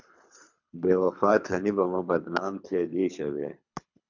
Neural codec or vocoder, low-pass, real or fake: codec, 24 kHz, 3 kbps, HILCodec; 7.2 kHz; fake